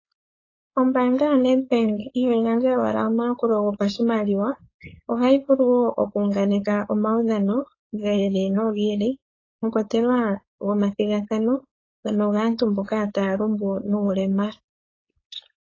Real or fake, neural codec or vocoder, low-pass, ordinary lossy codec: fake; codec, 16 kHz, 4.8 kbps, FACodec; 7.2 kHz; AAC, 32 kbps